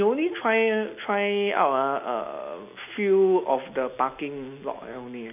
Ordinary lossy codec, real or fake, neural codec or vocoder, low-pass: none; real; none; 3.6 kHz